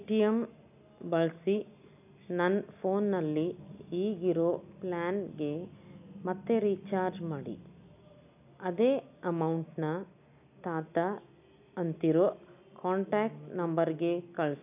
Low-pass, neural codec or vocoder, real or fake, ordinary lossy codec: 3.6 kHz; none; real; none